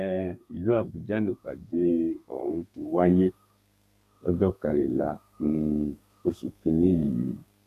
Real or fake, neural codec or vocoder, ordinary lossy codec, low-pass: fake; codec, 32 kHz, 1.9 kbps, SNAC; none; 14.4 kHz